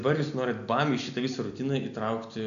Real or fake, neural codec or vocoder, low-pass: real; none; 7.2 kHz